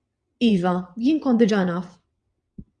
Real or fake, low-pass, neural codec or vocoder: fake; 9.9 kHz; vocoder, 22.05 kHz, 80 mel bands, WaveNeXt